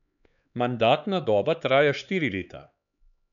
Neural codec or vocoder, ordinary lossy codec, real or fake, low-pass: codec, 16 kHz, 4 kbps, X-Codec, HuBERT features, trained on LibriSpeech; none; fake; 7.2 kHz